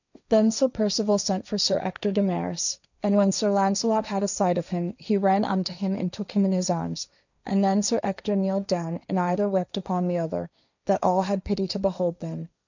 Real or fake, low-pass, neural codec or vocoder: fake; 7.2 kHz; codec, 16 kHz, 1.1 kbps, Voila-Tokenizer